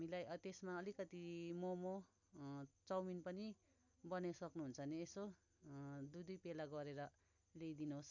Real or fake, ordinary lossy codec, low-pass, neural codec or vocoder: real; none; 7.2 kHz; none